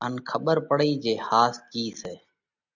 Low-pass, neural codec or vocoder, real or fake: 7.2 kHz; none; real